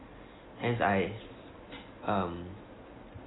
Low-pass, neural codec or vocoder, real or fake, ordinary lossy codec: 7.2 kHz; none; real; AAC, 16 kbps